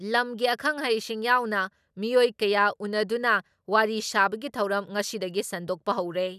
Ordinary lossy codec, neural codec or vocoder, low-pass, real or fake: none; none; 14.4 kHz; real